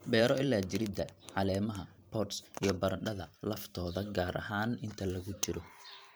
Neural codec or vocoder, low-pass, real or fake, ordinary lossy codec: vocoder, 44.1 kHz, 128 mel bands every 512 samples, BigVGAN v2; none; fake; none